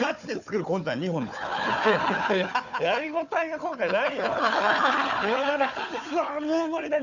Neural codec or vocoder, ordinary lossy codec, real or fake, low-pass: codec, 16 kHz, 4 kbps, FunCodec, trained on Chinese and English, 50 frames a second; none; fake; 7.2 kHz